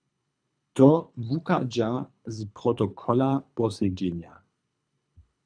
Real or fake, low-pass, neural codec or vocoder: fake; 9.9 kHz; codec, 24 kHz, 3 kbps, HILCodec